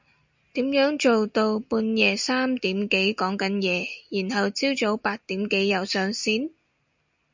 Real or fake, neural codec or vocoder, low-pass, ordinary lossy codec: real; none; 7.2 kHz; MP3, 64 kbps